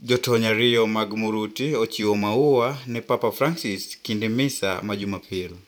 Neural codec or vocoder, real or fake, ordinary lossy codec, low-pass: vocoder, 44.1 kHz, 128 mel bands every 512 samples, BigVGAN v2; fake; none; 19.8 kHz